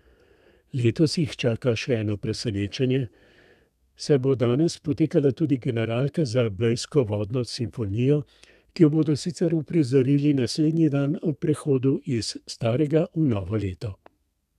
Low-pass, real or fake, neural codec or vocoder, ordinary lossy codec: 14.4 kHz; fake; codec, 32 kHz, 1.9 kbps, SNAC; none